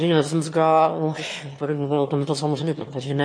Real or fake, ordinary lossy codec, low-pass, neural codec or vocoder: fake; MP3, 48 kbps; 9.9 kHz; autoencoder, 22.05 kHz, a latent of 192 numbers a frame, VITS, trained on one speaker